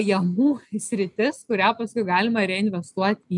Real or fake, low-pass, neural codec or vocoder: real; 10.8 kHz; none